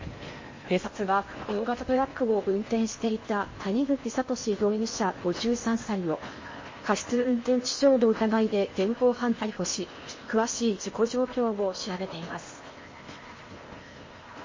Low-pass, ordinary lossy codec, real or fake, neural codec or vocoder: 7.2 kHz; MP3, 32 kbps; fake; codec, 16 kHz in and 24 kHz out, 0.8 kbps, FocalCodec, streaming, 65536 codes